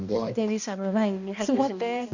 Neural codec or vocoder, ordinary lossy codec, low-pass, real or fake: codec, 16 kHz, 1 kbps, X-Codec, HuBERT features, trained on balanced general audio; none; 7.2 kHz; fake